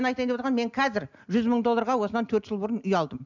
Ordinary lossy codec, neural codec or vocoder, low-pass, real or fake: none; none; 7.2 kHz; real